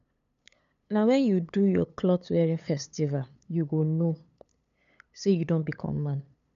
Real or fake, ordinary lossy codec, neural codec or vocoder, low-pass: fake; none; codec, 16 kHz, 8 kbps, FunCodec, trained on LibriTTS, 25 frames a second; 7.2 kHz